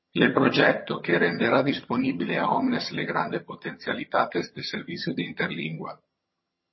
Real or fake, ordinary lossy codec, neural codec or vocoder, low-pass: fake; MP3, 24 kbps; vocoder, 22.05 kHz, 80 mel bands, HiFi-GAN; 7.2 kHz